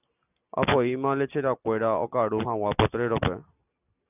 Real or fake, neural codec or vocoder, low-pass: real; none; 3.6 kHz